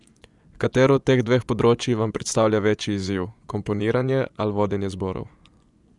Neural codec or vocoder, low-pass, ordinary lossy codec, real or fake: vocoder, 24 kHz, 100 mel bands, Vocos; 10.8 kHz; none; fake